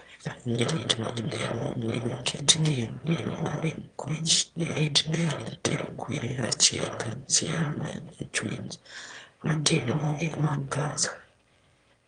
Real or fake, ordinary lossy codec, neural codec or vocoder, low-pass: fake; Opus, 32 kbps; autoencoder, 22.05 kHz, a latent of 192 numbers a frame, VITS, trained on one speaker; 9.9 kHz